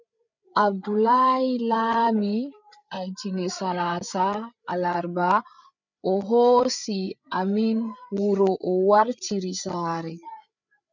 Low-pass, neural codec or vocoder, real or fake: 7.2 kHz; codec, 16 kHz, 8 kbps, FreqCodec, larger model; fake